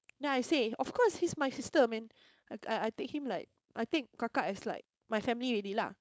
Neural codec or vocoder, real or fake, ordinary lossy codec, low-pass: codec, 16 kHz, 4.8 kbps, FACodec; fake; none; none